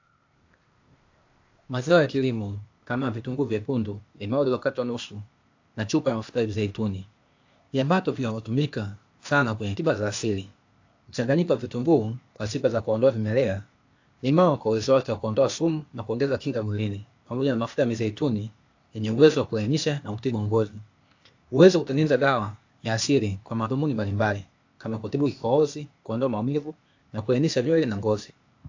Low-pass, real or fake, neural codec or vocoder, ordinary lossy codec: 7.2 kHz; fake; codec, 16 kHz, 0.8 kbps, ZipCodec; AAC, 48 kbps